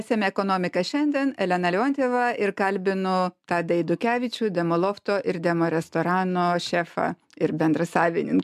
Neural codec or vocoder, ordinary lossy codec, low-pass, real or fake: none; MP3, 96 kbps; 14.4 kHz; real